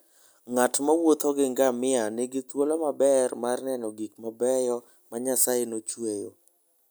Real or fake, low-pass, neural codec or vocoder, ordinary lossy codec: real; none; none; none